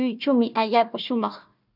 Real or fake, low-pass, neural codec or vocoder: fake; 5.4 kHz; codec, 16 kHz, 1 kbps, FunCodec, trained on Chinese and English, 50 frames a second